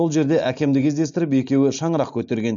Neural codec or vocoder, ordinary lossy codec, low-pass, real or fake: none; none; 7.2 kHz; real